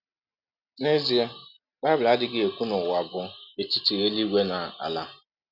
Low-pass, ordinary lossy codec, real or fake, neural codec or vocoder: 5.4 kHz; none; real; none